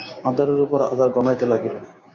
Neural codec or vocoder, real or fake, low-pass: codec, 16 kHz, 6 kbps, DAC; fake; 7.2 kHz